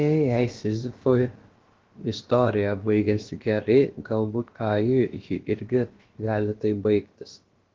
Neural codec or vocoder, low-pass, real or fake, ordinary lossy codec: codec, 16 kHz, about 1 kbps, DyCAST, with the encoder's durations; 7.2 kHz; fake; Opus, 16 kbps